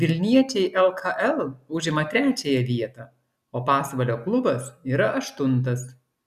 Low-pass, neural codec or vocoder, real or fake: 14.4 kHz; none; real